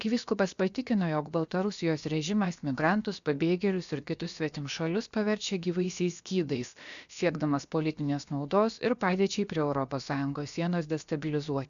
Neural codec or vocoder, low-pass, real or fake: codec, 16 kHz, about 1 kbps, DyCAST, with the encoder's durations; 7.2 kHz; fake